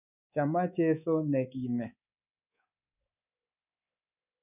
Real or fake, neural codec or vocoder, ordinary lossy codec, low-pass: fake; codec, 24 kHz, 3.1 kbps, DualCodec; none; 3.6 kHz